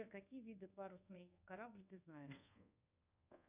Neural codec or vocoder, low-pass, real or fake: codec, 24 kHz, 1.2 kbps, DualCodec; 3.6 kHz; fake